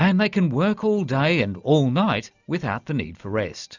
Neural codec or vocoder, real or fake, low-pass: none; real; 7.2 kHz